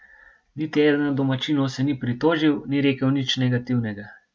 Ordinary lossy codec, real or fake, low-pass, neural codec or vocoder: none; real; none; none